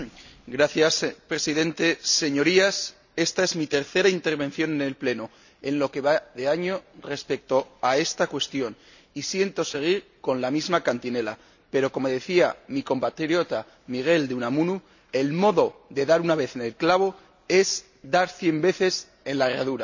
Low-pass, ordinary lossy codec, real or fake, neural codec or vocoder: 7.2 kHz; none; real; none